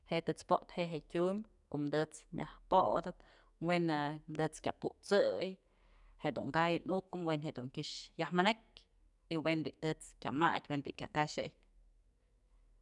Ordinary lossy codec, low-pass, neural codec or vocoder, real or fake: none; 10.8 kHz; codec, 44.1 kHz, 2.6 kbps, SNAC; fake